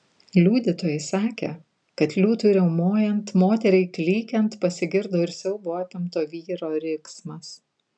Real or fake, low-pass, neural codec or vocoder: real; 9.9 kHz; none